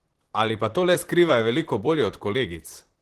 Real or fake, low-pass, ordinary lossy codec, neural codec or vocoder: fake; 14.4 kHz; Opus, 16 kbps; vocoder, 44.1 kHz, 128 mel bands, Pupu-Vocoder